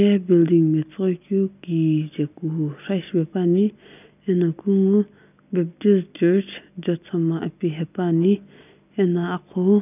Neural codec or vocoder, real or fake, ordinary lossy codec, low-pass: none; real; none; 3.6 kHz